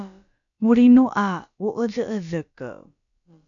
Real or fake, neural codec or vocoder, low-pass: fake; codec, 16 kHz, about 1 kbps, DyCAST, with the encoder's durations; 7.2 kHz